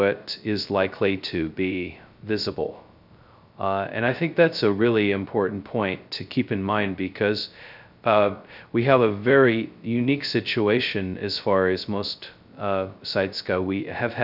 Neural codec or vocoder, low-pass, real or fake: codec, 16 kHz, 0.2 kbps, FocalCodec; 5.4 kHz; fake